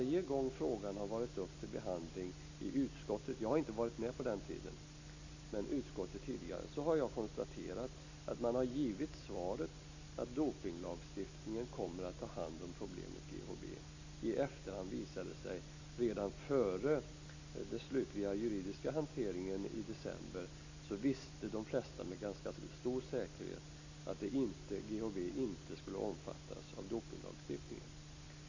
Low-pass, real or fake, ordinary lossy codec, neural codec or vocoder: 7.2 kHz; real; AAC, 48 kbps; none